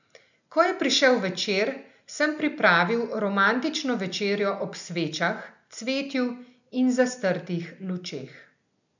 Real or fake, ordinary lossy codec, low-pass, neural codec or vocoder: real; none; 7.2 kHz; none